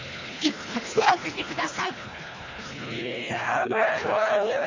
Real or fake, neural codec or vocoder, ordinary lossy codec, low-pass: fake; codec, 24 kHz, 1.5 kbps, HILCodec; MP3, 32 kbps; 7.2 kHz